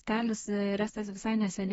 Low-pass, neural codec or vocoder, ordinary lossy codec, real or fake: 14.4 kHz; codec, 32 kHz, 1.9 kbps, SNAC; AAC, 24 kbps; fake